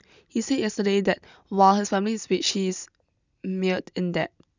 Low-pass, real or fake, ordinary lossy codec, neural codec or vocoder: 7.2 kHz; real; none; none